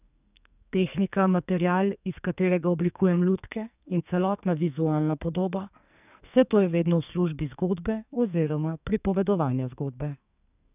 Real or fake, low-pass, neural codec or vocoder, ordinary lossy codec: fake; 3.6 kHz; codec, 44.1 kHz, 2.6 kbps, SNAC; none